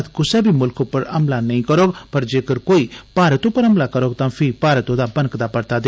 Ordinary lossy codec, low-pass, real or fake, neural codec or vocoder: none; none; real; none